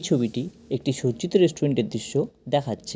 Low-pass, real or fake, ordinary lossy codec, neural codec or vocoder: none; real; none; none